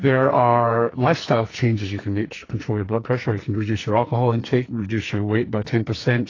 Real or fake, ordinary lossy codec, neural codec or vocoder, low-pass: fake; AAC, 32 kbps; codec, 44.1 kHz, 2.6 kbps, SNAC; 7.2 kHz